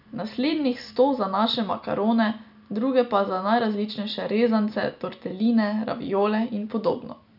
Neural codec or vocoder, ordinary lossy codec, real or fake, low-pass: none; none; real; 5.4 kHz